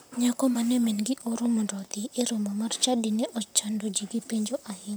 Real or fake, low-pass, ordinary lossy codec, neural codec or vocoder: fake; none; none; vocoder, 44.1 kHz, 128 mel bands, Pupu-Vocoder